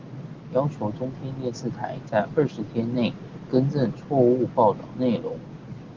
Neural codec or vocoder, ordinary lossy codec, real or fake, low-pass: none; Opus, 16 kbps; real; 7.2 kHz